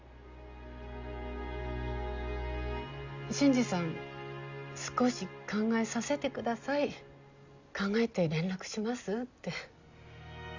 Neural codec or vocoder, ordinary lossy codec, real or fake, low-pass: none; Opus, 64 kbps; real; 7.2 kHz